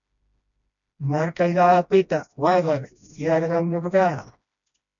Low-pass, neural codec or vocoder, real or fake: 7.2 kHz; codec, 16 kHz, 1 kbps, FreqCodec, smaller model; fake